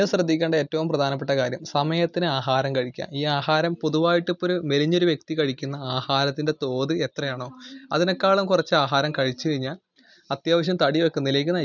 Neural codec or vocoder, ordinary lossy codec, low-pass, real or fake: none; none; 7.2 kHz; real